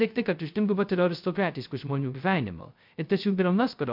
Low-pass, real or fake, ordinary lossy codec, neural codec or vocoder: 5.4 kHz; fake; MP3, 48 kbps; codec, 16 kHz, 0.2 kbps, FocalCodec